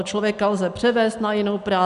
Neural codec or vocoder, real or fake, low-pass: none; real; 10.8 kHz